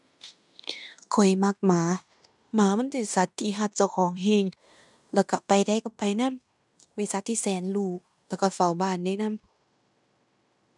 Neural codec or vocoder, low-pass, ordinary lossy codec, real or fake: codec, 16 kHz in and 24 kHz out, 0.9 kbps, LongCat-Audio-Codec, fine tuned four codebook decoder; 10.8 kHz; none; fake